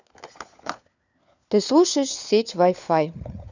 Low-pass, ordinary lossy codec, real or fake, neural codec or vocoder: 7.2 kHz; none; fake; codec, 16 kHz, 4 kbps, FunCodec, trained on LibriTTS, 50 frames a second